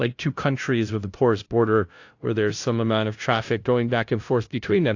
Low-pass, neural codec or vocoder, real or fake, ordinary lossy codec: 7.2 kHz; codec, 16 kHz, 0.5 kbps, FunCodec, trained on LibriTTS, 25 frames a second; fake; AAC, 48 kbps